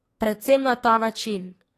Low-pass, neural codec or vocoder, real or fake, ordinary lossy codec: 14.4 kHz; codec, 44.1 kHz, 2.6 kbps, SNAC; fake; AAC, 48 kbps